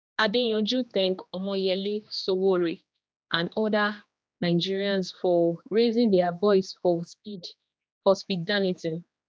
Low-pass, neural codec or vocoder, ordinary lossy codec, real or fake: none; codec, 16 kHz, 2 kbps, X-Codec, HuBERT features, trained on general audio; none; fake